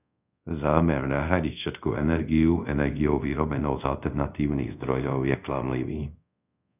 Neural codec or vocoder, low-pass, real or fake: codec, 24 kHz, 0.5 kbps, DualCodec; 3.6 kHz; fake